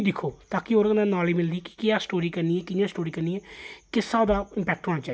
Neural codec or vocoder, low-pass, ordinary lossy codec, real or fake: none; none; none; real